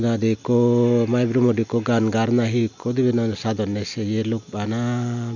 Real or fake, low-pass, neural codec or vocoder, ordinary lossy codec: real; 7.2 kHz; none; none